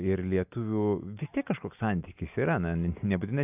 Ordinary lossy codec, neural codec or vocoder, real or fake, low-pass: AAC, 32 kbps; none; real; 3.6 kHz